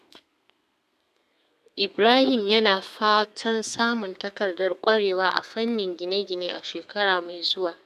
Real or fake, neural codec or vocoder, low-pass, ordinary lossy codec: fake; codec, 32 kHz, 1.9 kbps, SNAC; 14.4 kHz; none